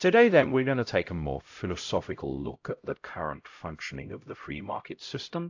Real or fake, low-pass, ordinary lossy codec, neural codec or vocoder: fake; 7.2 kHz; AAC, 48 kbps; codec, 16 kHz, 0.5 kbps, X-Codec, HuBERT features, trained on LibriSpeech